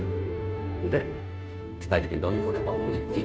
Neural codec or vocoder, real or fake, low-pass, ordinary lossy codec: codec, 16 kHz, 0.5 kbps, FunCodec, trained on Chinese and English, 25 frames a second; fake; none; none